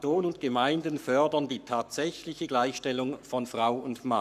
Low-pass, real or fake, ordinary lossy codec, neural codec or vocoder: 14.4 kHz; fake; none; codec, 44.1 kHz, 7.8 kbps, Pupu-Codec